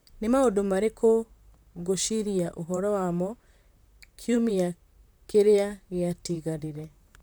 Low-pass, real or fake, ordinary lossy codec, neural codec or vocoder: none; fake; none; vocoder, 44.1 kHz, 128 mel bands, Pupu-Vocoder